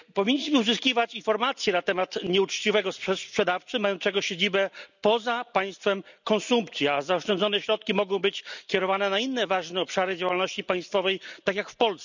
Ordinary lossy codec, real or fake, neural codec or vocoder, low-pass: none; real; none; 7.2 kHz